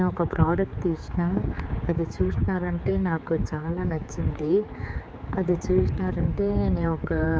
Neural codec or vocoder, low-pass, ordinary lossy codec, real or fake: codec, 16 kHz, 4 kbps, X-Codec, HuBERT features, trained on general audio; none; none; fake